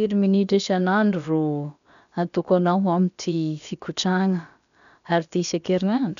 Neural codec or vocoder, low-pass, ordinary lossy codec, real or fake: codec, 16 kHz, about 1 kbps, DyCAST, with the encoder's durations; 7.2 kHz; none; fake